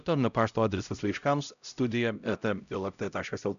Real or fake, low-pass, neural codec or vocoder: fake; 7.2 kHz; codec, 16 kHz, 0.5 kbps, X-Codec, HuBERT features, trained on LibriSpeech